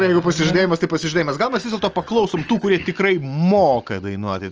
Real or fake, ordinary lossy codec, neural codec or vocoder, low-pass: real; Opus, 24 kbps; none; 7.2 kHz